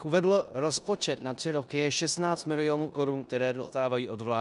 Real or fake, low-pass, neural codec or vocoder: fake; 10.8 kHz; codec, 16 kHz in and 24 kHz out, 0.9 kbps, LongCat-Audio-Codec, four codebook decoder